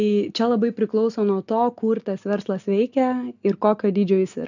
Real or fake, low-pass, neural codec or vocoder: real; 7.2 kHz; none